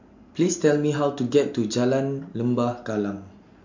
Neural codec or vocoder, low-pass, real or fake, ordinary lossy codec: none; 7.2 kHz; real; MP3, 48 kbps